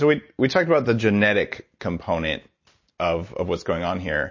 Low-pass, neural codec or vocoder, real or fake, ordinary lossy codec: 7.2 kHz; none; real; MP3, 32 kbps